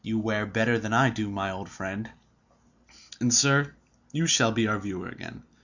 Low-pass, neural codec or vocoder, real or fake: 7.2 kHz; none; real